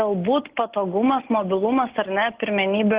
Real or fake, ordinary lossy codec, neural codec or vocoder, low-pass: real; Opus, 16 kbps; none; 3.6 kHz